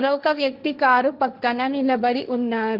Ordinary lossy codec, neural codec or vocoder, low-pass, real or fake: Opus, 24 kbps; codec, 16 kHz, 1.1 kbps, Voila-Tokenizer; 5.4 kHz; fake